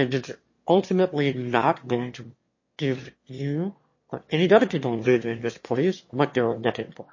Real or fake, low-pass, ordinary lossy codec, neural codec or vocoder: fake; 7.2 kHz; MP3, 32 kbps; autoencoder, 22.05 kHz, a latent of 192 numbers a frame, VITS, trained on one speaker